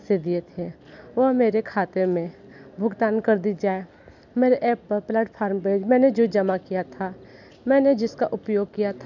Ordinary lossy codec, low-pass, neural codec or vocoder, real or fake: none; 7.2 kHz; none; real